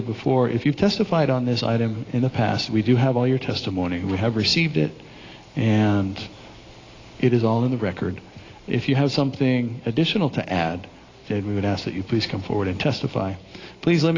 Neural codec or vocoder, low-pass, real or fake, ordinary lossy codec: none; 7.2 kHz; real; AAC, 32 kbps